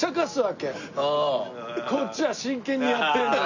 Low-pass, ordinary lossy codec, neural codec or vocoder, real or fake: 7.2 kHz; none; none; real